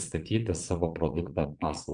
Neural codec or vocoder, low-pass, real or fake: vocoder, 22.05 kHz, 80 mel bands, Vocos; 9.9 kHz; fake